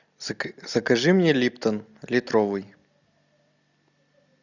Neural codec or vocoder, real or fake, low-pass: none; real; 7.2 kHz